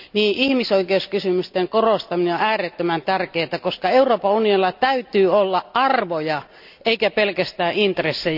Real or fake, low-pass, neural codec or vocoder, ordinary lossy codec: real; 5.4 kHz; none; none